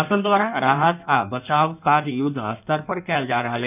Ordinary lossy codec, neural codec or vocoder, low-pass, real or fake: none; codec, 16 kHz in and 24 kHz out, 1.1 kbps, FireRedTTS-2 codec; 3.6 kHz; fake